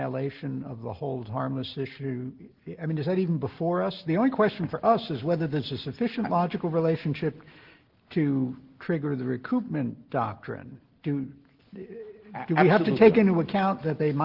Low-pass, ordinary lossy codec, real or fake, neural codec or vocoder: 5.4 kHz; Opus, 16 kbps; real; none